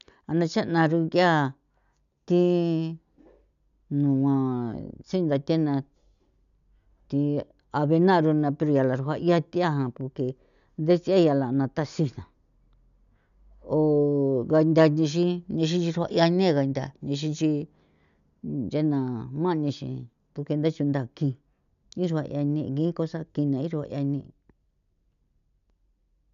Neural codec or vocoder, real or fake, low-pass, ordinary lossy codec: none; real; 7.2 kHz; none